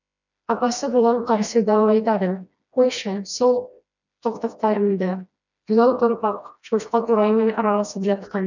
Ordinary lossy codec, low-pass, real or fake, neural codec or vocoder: none; 7.2 kHz; fake; codec, 16 kHz, 1 kbps, FreqCodec, smaller model